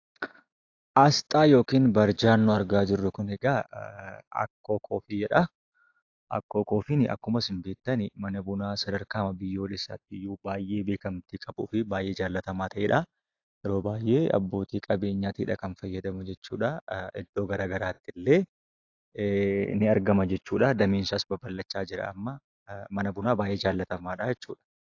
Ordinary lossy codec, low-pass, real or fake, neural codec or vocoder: AAC, 48 kbps; 7.2 kHz; real; none